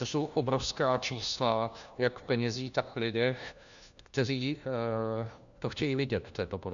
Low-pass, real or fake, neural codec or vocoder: 7.2 kHz; fake; codec, 16 kHz, 1 kbps, FunCodec, trained on LibriTTS, 50 frames a second